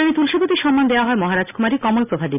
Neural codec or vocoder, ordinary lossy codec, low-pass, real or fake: none; none; 3.6 kHz; real